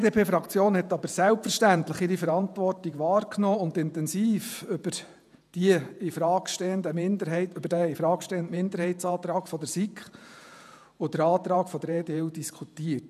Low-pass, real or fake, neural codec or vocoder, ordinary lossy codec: 14.4 kHz; fake; vocoder, 48 kHz, 128 mel bands, Vocos; none